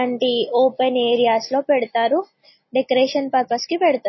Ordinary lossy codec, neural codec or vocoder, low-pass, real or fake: MP3, 24 kbps; none; 7.2 kHz; real